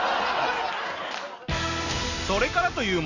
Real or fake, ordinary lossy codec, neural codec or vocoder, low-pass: real; none; none; 7.2 kHz